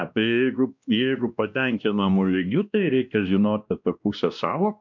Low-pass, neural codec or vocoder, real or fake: 7.2 kHz; codec, 16 kHz, 1 kbps, X-Codec, WavLM features, trained on Multilingual LibriSpeech; fake